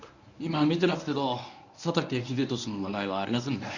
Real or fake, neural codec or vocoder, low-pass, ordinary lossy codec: fake; codec, 24 kHz, 0.9 kbps, WavTokenizer, medium speech release version 1; 7.2 kHz; none